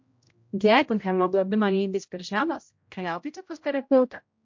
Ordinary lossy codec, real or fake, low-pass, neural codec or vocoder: MP3, 48 kbps; fake; 7.2 kHz; codec, 16 kHz, 0.5 kbps, X-Codec, HuBERT features, trained on general audio